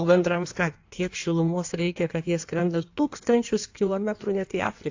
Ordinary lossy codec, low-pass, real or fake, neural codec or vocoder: AAC, 48 kbps; 7.2 kHz; fake; codec, 16 kHz in and 24 kHz out, 1.1 kbps, FireRedTTS-2 codec